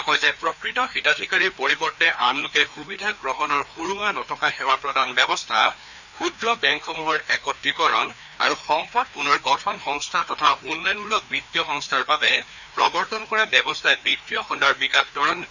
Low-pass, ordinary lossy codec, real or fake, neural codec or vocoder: 7.2 kHz; none; fake; codec, 16 kHz, 2 kbps, FreqCodec, larger model